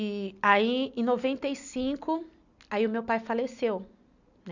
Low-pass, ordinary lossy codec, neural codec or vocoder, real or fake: 7.2 kHz; none; none; real